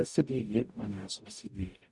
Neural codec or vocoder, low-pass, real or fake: codec, 44.1 kHz, 0.9 kbps, DAC; 10.8 kHz; fake